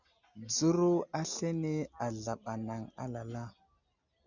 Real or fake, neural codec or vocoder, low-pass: real; none; 7.2 kHz